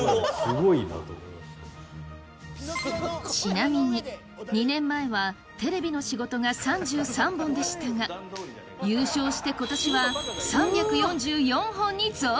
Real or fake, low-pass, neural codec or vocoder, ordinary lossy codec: real; none; none; none